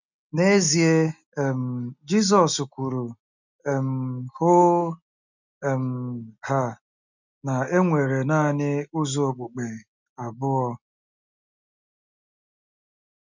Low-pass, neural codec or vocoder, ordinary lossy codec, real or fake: 7.2 kHz; none; none; real